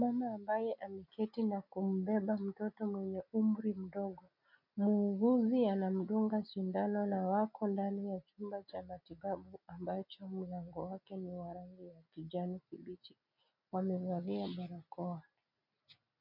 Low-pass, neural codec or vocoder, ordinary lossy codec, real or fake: 5.4 kHz; none; MP3, 48 kbps; real